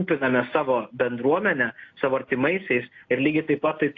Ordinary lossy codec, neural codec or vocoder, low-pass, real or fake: AAC, 48 kbps; none; 7.2 kHz; real